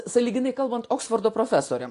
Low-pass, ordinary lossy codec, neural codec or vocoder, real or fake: 10.8 kHz; AAC, 48 kbps; none; real